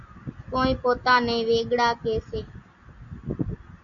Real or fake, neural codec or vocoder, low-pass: real; none; 7.2 kHz